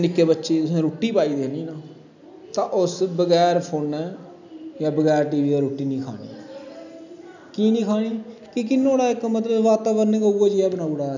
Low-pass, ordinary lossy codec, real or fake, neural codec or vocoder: 7.2 kHz; none; real; none